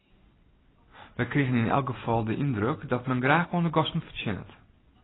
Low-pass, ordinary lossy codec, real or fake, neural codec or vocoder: 7.2 kHz; AAC, 16 kbps; real; none